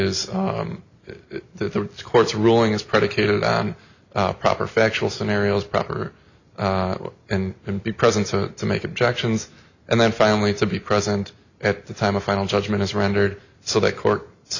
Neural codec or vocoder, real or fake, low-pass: none; real; 7.2 kHz